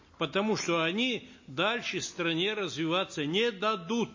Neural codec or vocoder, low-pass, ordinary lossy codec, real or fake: none; 7.2 kHz; MP3, 32 kbps; real